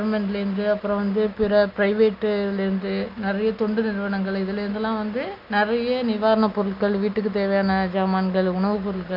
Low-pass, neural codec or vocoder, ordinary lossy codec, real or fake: 5.4 kHz; none; none; real